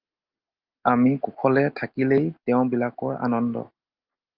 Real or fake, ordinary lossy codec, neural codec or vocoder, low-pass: real; Opus, 32 kbps; none; 5.4 kHz